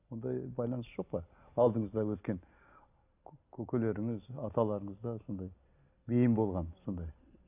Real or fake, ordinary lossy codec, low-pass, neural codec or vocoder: real; none; 3.6 kHz; none